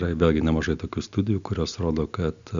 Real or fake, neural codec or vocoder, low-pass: real; none; 7.2 kHz